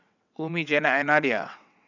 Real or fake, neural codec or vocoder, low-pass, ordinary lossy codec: fake; vocoder, 22.05 kHz, 80 mel bands, WaveNeXt; 7.2 kHz; none